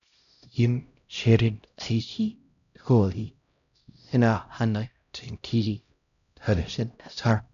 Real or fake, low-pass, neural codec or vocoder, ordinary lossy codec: fake; 7.2 kHz; codec, 16 kHz, 0.5 kbps, X-Codec, HuBERT features, trained on LibriSpeech; none